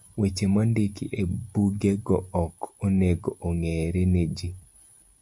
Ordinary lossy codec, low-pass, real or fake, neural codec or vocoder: MP3, 96 kbps; 10.8 kHz; real; none